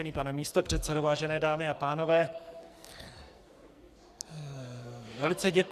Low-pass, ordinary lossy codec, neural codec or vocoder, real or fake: 14.4 kHz; Opus, 64 kbps; codec, 44.1 kHz, 2.6 kbps, SNAC; fake